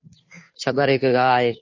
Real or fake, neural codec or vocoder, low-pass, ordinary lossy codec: fake; codec, 16 kHz, 2 kbps, FunCodec, trained on Chinese and English, 25 frames a second; 7.2 kHz; MP3, 32 kbps